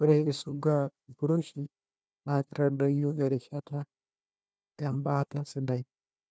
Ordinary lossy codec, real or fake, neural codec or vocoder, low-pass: none; fake; codec, 16 kHz, 1 kbps, FunCodec, trained on Chinese and English, 50 frames a second; none